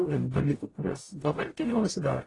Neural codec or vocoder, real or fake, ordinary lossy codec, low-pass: codec, 44.1 kHz, 0.9 kbps, DAC; fake; AAC, 32 kbps; 10.8 kHz